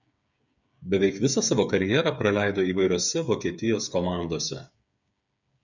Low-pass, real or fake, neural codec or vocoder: 7.2 kHz; fake; codec, 16 kHz, 8 kbps, FreqCodec, smaller model